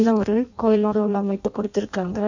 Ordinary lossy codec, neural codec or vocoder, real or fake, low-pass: none; codec, 16 kHz in and 24 kHz out, 0.6 kbps, FireRedTTS-2 codec; fake; 7.2 kHz